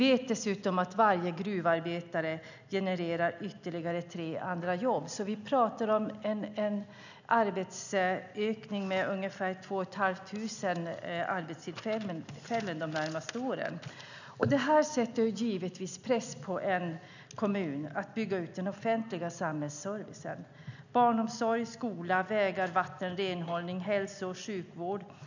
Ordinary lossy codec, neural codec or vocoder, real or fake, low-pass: none; none; real; 7.2 kHz